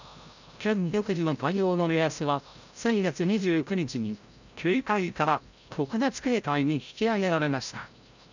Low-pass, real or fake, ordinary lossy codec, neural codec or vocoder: 7.2 kHz; fake; none; codec, 16 kHz, 0.5 kbps, FreqCodec, larger model